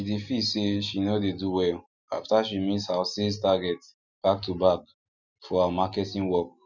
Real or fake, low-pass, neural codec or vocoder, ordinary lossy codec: real; 7.2 kHz; none; none